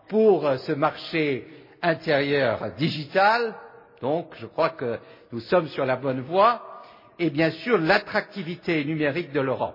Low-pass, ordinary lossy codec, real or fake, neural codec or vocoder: 5.4 kHz; MP3, 24 kbps; real; none